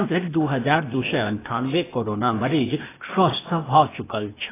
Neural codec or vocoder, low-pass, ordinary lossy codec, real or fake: codec, 16 kHz, 0.8 kbps, ZipCodec; 3.6 kHz; AAC, 16 kbps; fake